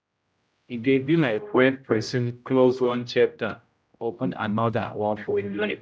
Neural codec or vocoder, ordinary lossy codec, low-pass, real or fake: codec, 16 kHz, 0.5 kbps, X-Codec, HuBERT features, trained on general audio; none; none; fake